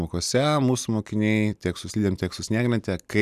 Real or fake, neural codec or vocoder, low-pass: real; none; 14.4 kHz